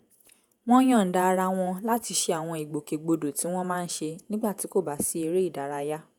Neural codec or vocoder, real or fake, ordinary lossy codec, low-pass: vocoder, 48 kHz, 128 mel bands, Vocos; fake; none; none